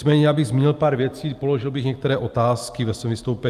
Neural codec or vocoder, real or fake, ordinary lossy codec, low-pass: none; real; Opus, 32 kbps; 14.4 kHz